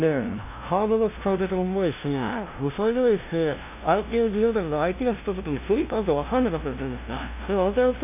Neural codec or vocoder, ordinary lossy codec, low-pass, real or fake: codec, 16 kHz, 0.5 kbps, FunCodec, trained on LibriTTS, 25 frames a second; none; 3.6 kHz; fake